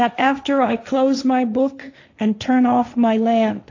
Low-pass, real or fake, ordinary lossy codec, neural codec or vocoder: 7.2 kHz; fake; AAC, 48 kbps; codec, 16 kHz, 1.1 kbps, Voila-Tokenizer